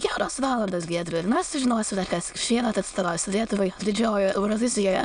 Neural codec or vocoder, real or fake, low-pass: autoencoder, 22.05 kHz, a latent of 192 numbers a frame, VITS, trained on many speakers; fake; 9.9 kHz